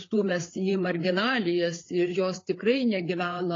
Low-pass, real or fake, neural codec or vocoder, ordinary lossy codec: 7.2 kHz; fake; codec, 16 kHz, 4 kbps, FreqCodec, larger model; AAC, 32 kbps